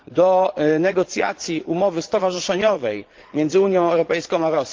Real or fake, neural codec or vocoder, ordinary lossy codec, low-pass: fake; vocoder, 22.05 kHz, 80 mel bands, Vocos; Opus, 16 kbps; 7.2 kHz